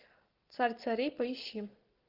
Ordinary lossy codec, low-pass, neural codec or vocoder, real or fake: Opus, 24 kbps; 5.4 kHz; none; real